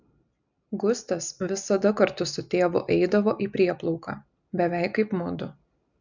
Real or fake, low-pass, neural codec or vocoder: real; 7.2 kHz; none